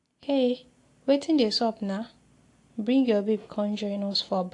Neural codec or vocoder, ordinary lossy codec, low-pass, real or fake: none; AAC, 48 kbps; 10.8 kHz; real